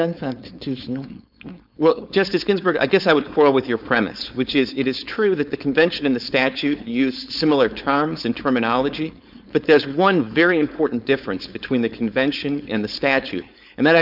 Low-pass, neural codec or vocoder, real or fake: 5.4 kHz; codec, 16 kHz, 4.8 kbps, FACodec; fake